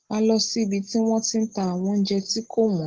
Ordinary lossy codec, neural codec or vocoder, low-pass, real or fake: Opus, 16 kbps; none; 7.2 kHz; real